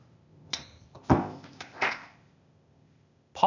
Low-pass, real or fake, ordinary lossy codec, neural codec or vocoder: 7.2 kHz; fake; none; codec, 16 kHz, 0.8 kbps, ZipCodec